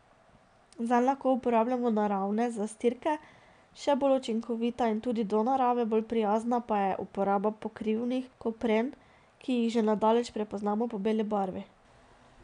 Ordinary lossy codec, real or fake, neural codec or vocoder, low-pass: none; real; none; 9.9 kHz